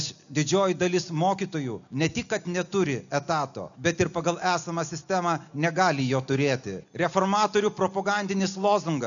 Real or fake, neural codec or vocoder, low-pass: real; none; 7.2 kHz